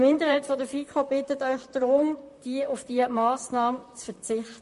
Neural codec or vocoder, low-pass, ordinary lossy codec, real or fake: vocoder, 44.1 kHz, 128 mel bands, Pupu-Vocoder; 14.4 kHz; MP3, 48 kbps; fake